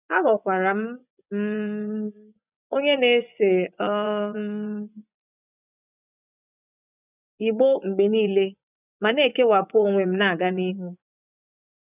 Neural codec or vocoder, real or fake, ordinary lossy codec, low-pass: vocoder, 24 kHz, 100 mel bands, Vocos; fake; none; 3.6 kHz